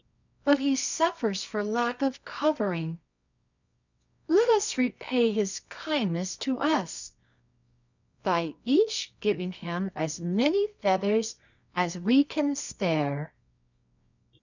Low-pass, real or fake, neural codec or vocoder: 7.2 kHz; fake; codec, 24 kHz, 0.9 kbps, WavTokenizer, medium music audio release